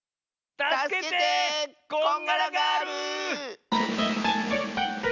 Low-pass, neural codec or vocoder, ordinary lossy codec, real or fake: 7.2 kHz; none; none; real